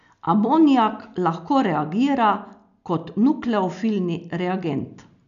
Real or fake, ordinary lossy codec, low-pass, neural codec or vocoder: real; none; 7.2 kHz; none